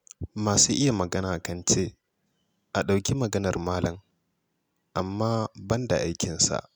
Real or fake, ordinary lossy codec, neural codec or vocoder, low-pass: real; none; none; none